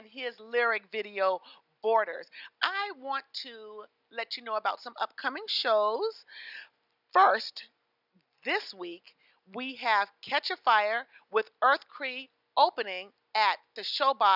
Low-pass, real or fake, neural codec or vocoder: 5.4 kHz; real; none